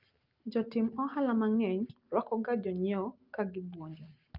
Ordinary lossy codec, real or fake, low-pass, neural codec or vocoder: Opus, 24 kbps; real; 5.4 kHz; none